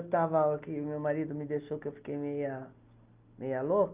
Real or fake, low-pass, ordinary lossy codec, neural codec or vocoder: real; 3.6 kHz; Opus, 32 kbps; none